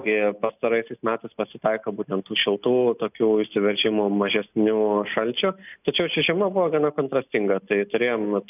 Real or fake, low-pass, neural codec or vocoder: real; 3.6 kHz; none